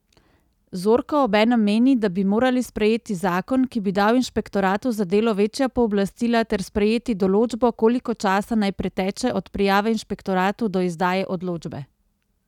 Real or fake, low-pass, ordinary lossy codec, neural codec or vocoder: real; 19.8 kHz; none; none